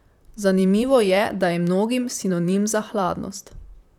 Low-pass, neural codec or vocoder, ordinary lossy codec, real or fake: 19.8 kHz; vocoder, 44.1 kHz, 128 mel bands, Pupu-Vocoder; none; fake